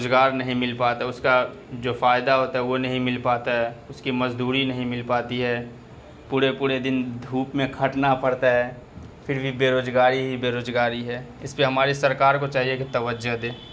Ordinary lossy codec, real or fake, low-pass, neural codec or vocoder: none; real; none; none